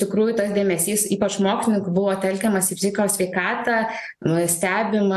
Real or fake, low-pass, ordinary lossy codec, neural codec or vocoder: real; 14.4 kHz; AAC, 96 kbps; none